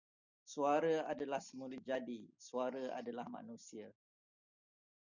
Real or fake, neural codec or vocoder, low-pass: real; none; 7.2 kHz